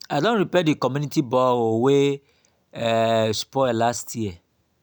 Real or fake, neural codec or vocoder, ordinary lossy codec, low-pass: real; none; none; none